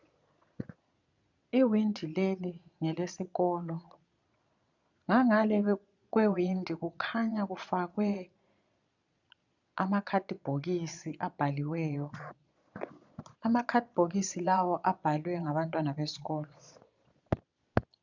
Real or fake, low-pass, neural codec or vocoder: fake; 7.2 kHz; vocoder, 22.05 kHz, 80 mel bands, Vocos